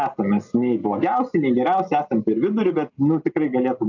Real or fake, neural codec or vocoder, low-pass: real; none; 7.2 kHz